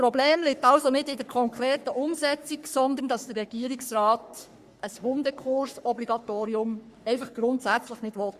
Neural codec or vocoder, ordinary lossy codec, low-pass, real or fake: codec, 44.1 kHz, 3.4 kbps, Pupu-Codec; Opus, 64 kbps; 14.4 kHz; fake